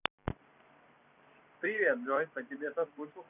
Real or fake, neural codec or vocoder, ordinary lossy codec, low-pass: fake; vocoder, 44.1 kHz, 128 mel bands every 256 samples, BigVGAN v2; none; 3.6 kHz